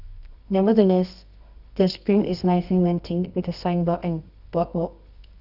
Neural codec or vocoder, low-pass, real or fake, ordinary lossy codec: codec, 24 kHz, 0.9 kbps, WavTokenizer, medium music audio release; 5.4 kHz; fake; none